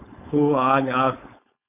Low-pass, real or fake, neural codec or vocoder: 3.6 kHz; fake; codec, 16 kHz, 4.8 kbps, FACodec